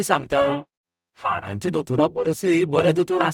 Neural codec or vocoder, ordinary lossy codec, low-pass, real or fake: codec, 44.1 kHz, 0.9 kbps, DAC; none; 19.8 kHz; fake